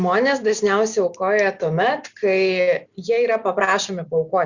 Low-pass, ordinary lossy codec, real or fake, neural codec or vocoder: 7.2 kHz; Opus, 64 kbps; real; none